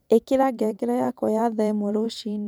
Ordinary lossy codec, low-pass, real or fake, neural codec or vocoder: none; none; fake; vocoder, 44.1 kHz, 128 mel bands every 512 samples, BigVGAN v2